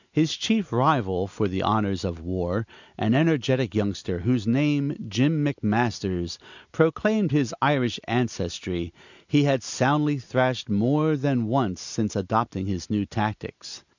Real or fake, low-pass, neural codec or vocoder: real; 7.2 kHz; none